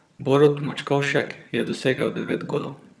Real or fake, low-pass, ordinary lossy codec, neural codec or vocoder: fake; none; none; vocoder, 22.05 kHz, 80 mel bands, HiFi-GAN